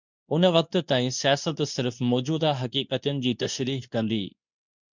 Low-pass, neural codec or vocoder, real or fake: 7.2 kHz; codec, 24 kHz, 0.9 kbps, WavTokenizer, medium speech release version 2; fake